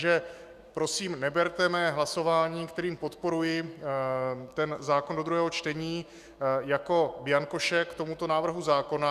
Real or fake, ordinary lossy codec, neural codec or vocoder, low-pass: fake; Opus, 64 kbps; autoencoder, 48 kHz, 128 numbers a frame, DAC-VAE, trained on Japanese speech; 14.4 kHz